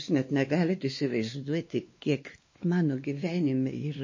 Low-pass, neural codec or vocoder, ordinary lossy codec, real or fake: 7.2 kHz; codec, 16 kHz, 2 kbps, X-Codec, WavLM features, trained on Multilingual LibriSpeech; MP3, 32 kbps; fake